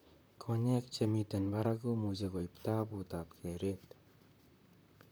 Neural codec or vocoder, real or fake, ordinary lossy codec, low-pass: vocoder, 44.1 kHz, 128 mel bands, Pupu-Vocoder; fake; none; none